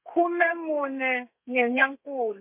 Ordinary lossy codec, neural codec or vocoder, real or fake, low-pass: MP3, 32 kbps; codec, 32 kHz, 1.9 kbps, SNAC; fake; 3.6 kHz